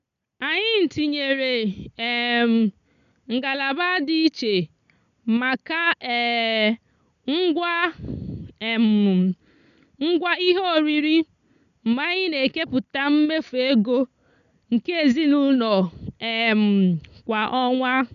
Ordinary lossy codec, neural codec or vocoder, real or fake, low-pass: none; none; real; 7.2 kHz